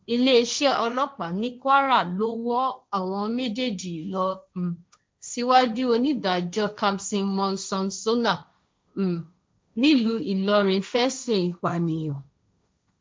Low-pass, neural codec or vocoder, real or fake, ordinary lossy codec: none; codec, 16 kHz, 1.1 kbps, Voila-Tokenizer; fake; none